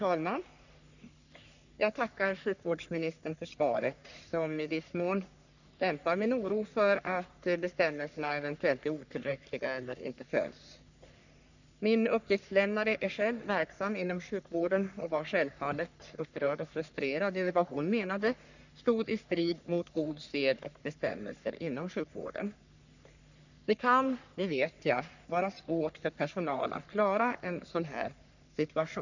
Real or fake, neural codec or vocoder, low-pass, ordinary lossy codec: fake; codec, 44.1 kHz, 3.4 kbps, Pupu-Codec; 7.2 kHz; none